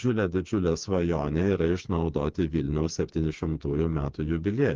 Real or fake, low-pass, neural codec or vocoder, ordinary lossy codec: fake; 7.2 kHz; codec, 16 kHz, 4 kbps, FreqCodec, smaller model; Opus, 32 kbps